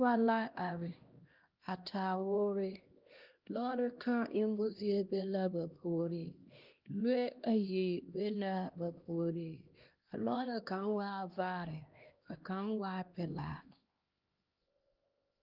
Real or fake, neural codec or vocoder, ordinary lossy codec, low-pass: fake; codec, 16 kHz, 1 kbps, X-Codec, HuBERT features, trained on LibriSpeech; Opus, 24 kbps; 5.4 kHz